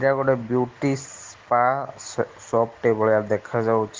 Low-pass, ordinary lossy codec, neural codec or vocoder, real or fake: 7.2 kHz; Opus, 16 kbps; none; real